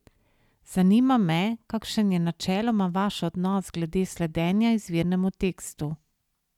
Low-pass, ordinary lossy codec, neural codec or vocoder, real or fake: 19.8 kHz; none; none; real